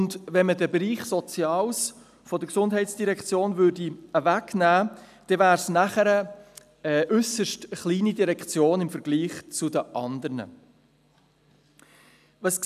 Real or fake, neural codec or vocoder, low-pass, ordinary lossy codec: real; none; 14.4 kHz; none